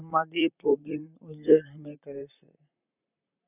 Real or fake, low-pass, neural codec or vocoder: fake; 3.6 kHz; vocoder, 44.1 kHz, 128 mel bands, Pupu-Vocoder